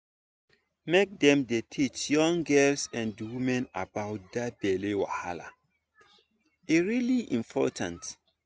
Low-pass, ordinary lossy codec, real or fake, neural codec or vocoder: none; none; real; none